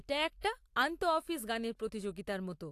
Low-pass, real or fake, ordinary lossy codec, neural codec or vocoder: 14.4 kHz; real; AAC, 64 kbps; none